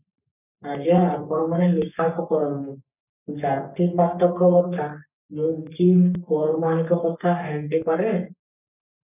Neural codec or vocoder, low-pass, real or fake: codec, 44.1 kHz, 3.4 kbps, Pupu-Codec; 3.6 kHz; fake